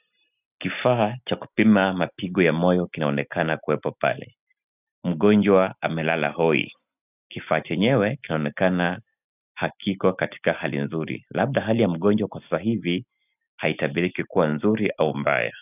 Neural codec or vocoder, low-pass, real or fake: none; 3.6 kHz; real